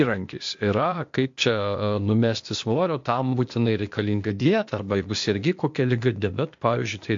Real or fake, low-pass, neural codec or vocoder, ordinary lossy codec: fake; 7.2 kHz; codec, 16 kHz, 0.8 kbps, ZipCodec; MP3, 48 kbps